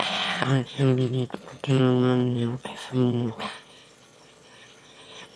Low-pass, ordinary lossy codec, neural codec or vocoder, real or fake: none; none; autoencoder, 22.05 kHz, a latent of 192 numbers a frame, VITS, trained on one speaker; fake